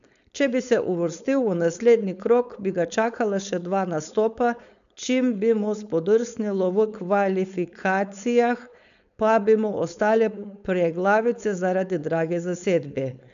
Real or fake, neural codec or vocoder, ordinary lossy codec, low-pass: fake; codec, 16 kHz, 4.8 kbps, FACodec; none; 7.2 kHz